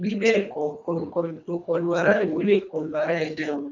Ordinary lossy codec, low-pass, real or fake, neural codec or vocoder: none; 7.2 kHz; fake; codec, 24 kHz, 1.5 kbps, HILCodec